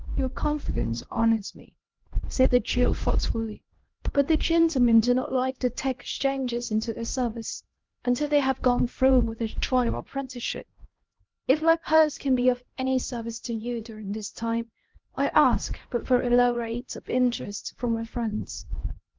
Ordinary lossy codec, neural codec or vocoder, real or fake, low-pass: Opus, 16 kbps; codec, 16 kHz, 1 kbps, X-Codec, HuBERT features, trained on LibriSpeech; fake; 7.2 kHz